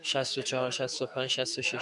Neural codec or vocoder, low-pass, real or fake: autoencoder, 48 kHz, 128 numbers a frame, DAC-VAE, trained on Japanese speech; 10.8 kHz; fake